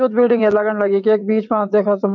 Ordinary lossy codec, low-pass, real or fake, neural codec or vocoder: none; 7.2 kHz; fake; vocoder, 22.05 kHz, 80 mel bands, WaveNeXt